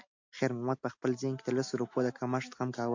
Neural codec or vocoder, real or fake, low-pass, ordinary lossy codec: none; real; 7.2 kHz; AAC, 48 kbps